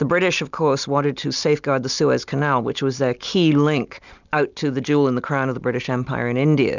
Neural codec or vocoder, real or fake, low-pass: none; real; 7.2 kHz